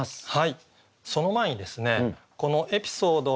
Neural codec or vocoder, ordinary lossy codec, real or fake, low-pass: none; none; real; none